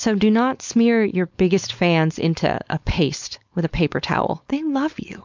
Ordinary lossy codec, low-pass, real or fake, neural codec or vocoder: MP3, 64 kbps; 7.2 kHz; fake; codec, 16 kHz, 4.8 kbps, FACodec